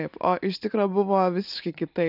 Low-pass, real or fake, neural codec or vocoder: 5.4 kHz; real; none